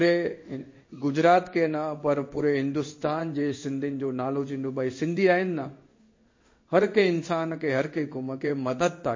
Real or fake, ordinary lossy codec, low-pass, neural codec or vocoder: fake; MP3, 32 kbps; 7.2 kHz; codec, 16 kHz in and 24 kHz out, 1 kbps, XY-Tokenizer